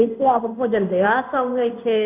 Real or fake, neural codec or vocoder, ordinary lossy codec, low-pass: fake; codec, 16 kHz in and 24 kHz out, 1 kbps, XY-Tokenizer; none; 3.6 kHz